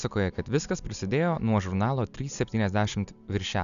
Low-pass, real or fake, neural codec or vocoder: 7.2 kHz; real; none